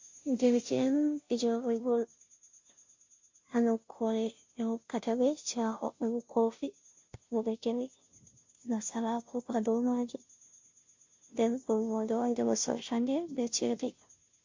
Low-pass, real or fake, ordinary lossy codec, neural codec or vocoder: 7.2 kHz; fake; MP3, 48 kbps; codec, 16 kHz, 0.5 kbps, FunCodec, trained on Chinese and English, 25 frames a second